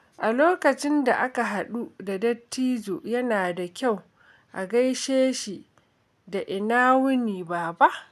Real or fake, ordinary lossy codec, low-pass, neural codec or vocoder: real; none; 14.4 kHz; none